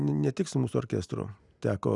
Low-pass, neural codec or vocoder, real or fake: 10.8 kHz; none; real